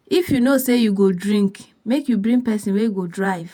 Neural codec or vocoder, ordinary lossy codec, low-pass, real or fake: vocoder, 48 kHz, 128 mel bands, Vocos; none; 19.8 kHz; fake